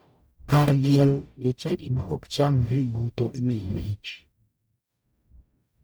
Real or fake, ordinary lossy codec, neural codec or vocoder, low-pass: fake; none; codec, 44.1 kHz, 0.9 kbps, DAC; none